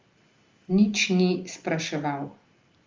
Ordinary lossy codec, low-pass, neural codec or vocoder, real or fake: Opus, 32 kbps; 7.2 kHz; none; real